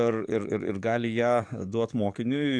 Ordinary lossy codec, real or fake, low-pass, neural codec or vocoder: AAC, 64 kbps; fake; 9.9 kHz; codec, 44.1 kHz, 7.8 kbps, DAC